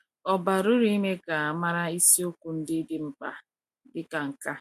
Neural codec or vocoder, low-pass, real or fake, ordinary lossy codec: none; 14.4 kHz; real; MP3, 64 kbps